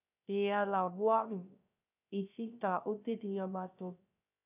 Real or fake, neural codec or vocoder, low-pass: fake; codec, 16 kHz, 0.3 kbps, FocalCodec; 3.6 kHz